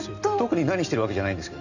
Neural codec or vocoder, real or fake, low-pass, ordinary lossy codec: none; real; 7.2 kHz; none